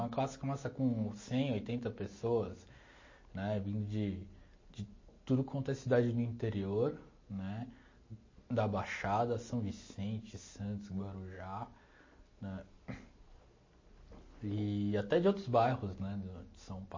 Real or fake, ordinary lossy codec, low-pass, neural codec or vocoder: real; MP3, 32 kbps; 7.2 kHz; none